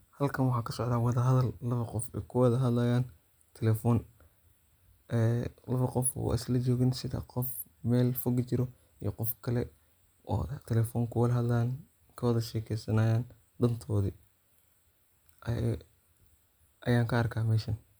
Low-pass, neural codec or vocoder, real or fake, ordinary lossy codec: none; none; real; none